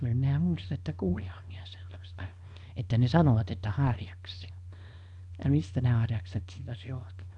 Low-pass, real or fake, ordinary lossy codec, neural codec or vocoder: 10.8 kHz; fake; none; codec, 24 kHz, 0.9 kbps, WavTokenizer, small release